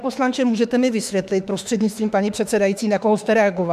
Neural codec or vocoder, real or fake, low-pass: autoencoder, 48 kHz, 32 numbers a frame, DAC-VAE, trained on Japanese speech; fake; 14.4 kHz